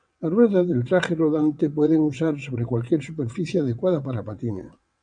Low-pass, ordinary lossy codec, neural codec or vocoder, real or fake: 9.9 kHz; MP3, 96 kbps; vocoder, 22.05 kHz, 80 mel bands, WaveNeXt; fake